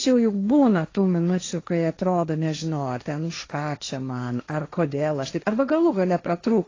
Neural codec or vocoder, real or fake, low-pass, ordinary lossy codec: codec, 16 kHz, 1.1 kbps, Voila-Tokenizer; fake; 7.2 kHz; AAC, 32 kbps